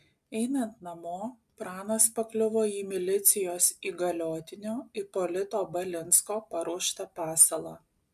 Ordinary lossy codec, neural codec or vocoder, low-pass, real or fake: MP3, 96 kbps; none; 14.4 kHz; real